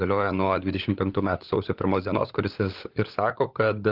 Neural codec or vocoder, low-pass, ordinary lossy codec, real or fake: codec, 16 kHz, 16 kbps, FunCodec, trained on LibriTTS, 50 frames a second; 5.4 kHz; Opus, 32 kbps; fake